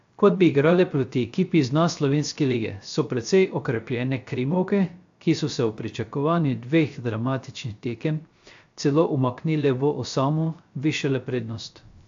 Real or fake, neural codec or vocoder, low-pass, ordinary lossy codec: fake; codec, 16 kHz, 0.3 kbps, FocalCodec; 7.2 kHz; AAC, 64 kbps